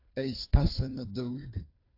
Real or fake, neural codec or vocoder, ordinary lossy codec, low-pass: fake; codec, 16 kHz, 2 kbps, FunCodec, trained on Chinese and English, 25 frames a second; AAC, 32 kbps; 5.4 kHz